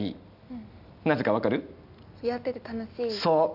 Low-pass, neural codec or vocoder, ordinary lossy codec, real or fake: 5.4 kHz; none; none; real